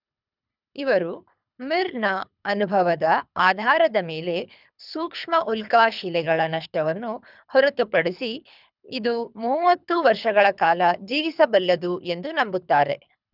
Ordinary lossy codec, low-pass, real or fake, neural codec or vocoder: none; 5.4 kHz; fake; codec, 24 kHz, 3 kbps, HILCodec